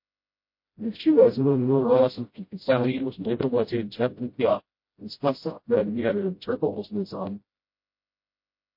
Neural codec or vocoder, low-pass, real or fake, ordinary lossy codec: codec, 16 kHz, 0.5 kbps, FreqCodec, smaller model; 5.4 kHz; fake; MP3, 32 kbps